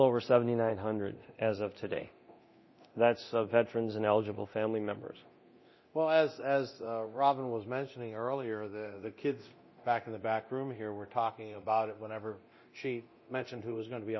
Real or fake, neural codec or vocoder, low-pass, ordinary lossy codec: fake; codec, 24 kHz, 0.9 kbps, DualCodec; 7.2 kHz; MP3, 24 kbps